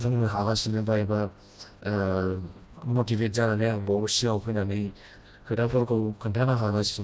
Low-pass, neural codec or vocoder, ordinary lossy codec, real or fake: none; codec, 16 kHz, 1 kbps, FreqCodec, smaller model; none; fake